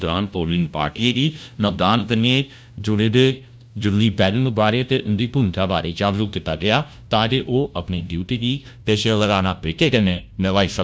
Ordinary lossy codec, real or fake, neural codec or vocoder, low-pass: none; fake; codec, 16 kHz, 0.5 kbps, FunCodec, trained on LibriTTS, 25 frames a second; none